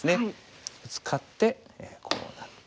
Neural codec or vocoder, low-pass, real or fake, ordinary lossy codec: none; none; real; none